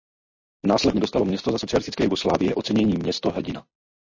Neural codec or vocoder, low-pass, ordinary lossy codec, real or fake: none; 7.2 kHz; MP3, 32 kbps; real